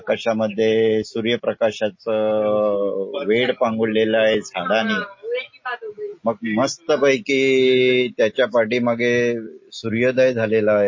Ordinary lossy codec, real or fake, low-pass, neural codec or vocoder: MP3, 32 kbps; real; 7.2 kHz; none